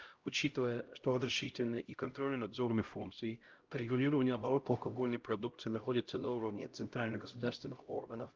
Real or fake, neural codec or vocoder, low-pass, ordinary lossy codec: fake; codec, 16 kHz, 0.5 kbps, X-Codec, HuBERT features, trained on LibriSpeech; 7.2 kHz; Opus, 32 kbps